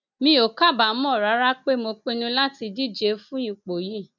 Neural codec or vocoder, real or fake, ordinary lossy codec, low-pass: none; real; none; 7.2 kHz